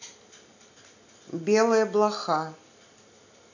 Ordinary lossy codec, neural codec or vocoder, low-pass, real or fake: none; none; 7.2 kHz; real